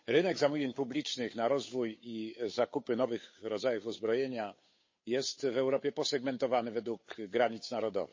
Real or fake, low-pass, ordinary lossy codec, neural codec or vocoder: fake; 7.2 kHz; MP3, 32 kbps; codec, 16 kHz, 8 kbps, FunCodec, trained on Chinese and English, 25 frames a second